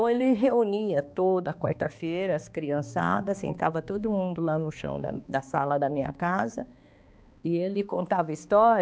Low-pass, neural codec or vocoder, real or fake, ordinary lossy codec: none; codec, 16 kHz, 2 kbps, X-Codec, HuBERT features, trained on balanced general audio; fake; none